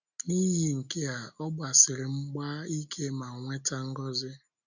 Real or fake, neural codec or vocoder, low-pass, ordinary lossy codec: real; none; 7.2 kHz; none